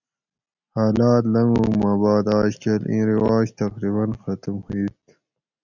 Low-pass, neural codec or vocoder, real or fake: 7.2 kHz; none; real